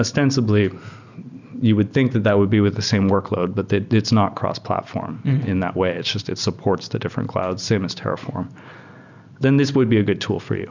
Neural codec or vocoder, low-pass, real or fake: none; 7.2 kHz; real